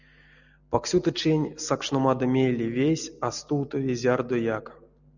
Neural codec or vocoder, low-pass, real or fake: none; 7.2 kHz; real